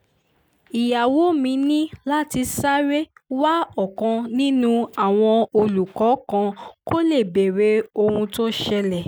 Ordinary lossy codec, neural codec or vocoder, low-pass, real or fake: none; none; none; real